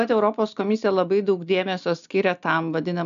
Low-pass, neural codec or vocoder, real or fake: 7.2 kHz; none; real